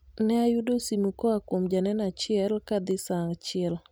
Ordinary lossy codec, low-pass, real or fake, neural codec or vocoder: none; none; real; none